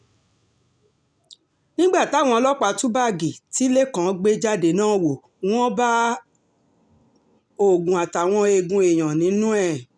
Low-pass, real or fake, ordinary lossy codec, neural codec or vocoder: 9.9 kHz; real; none; none